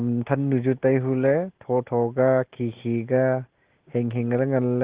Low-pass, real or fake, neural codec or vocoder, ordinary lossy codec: 3.6 kHz; real; none; Opus, 16 kbps